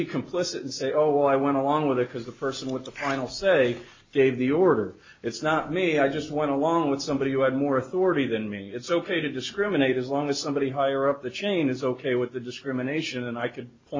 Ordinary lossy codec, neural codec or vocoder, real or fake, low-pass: MP3, 32 kbps; none; real; 7.2 kHz